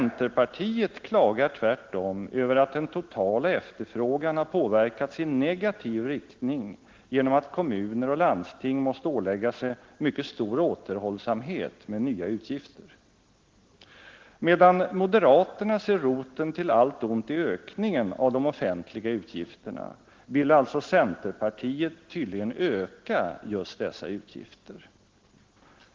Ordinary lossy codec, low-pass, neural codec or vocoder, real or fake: Opus, 16 kbps; 7.2 kHz; none; real